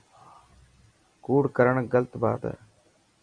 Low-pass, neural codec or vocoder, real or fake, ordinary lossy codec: 9.9 kHz; vocoder, 44.1 kHz, 128 mel bands every 256 samples, BigVGAN v2; fake; Opus, 64 kbps